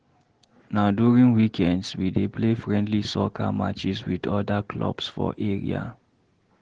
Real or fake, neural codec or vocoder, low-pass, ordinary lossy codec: real; none; 9.9 kHz; Opus, 16 kbps